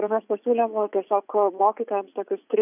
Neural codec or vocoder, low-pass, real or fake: vocoder, 44.1 kHz, 80 mel bands, Vocos; 3.6 kHz; fake